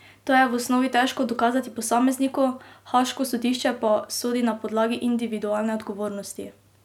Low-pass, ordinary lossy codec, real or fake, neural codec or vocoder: 19.8 kHz; none; real; none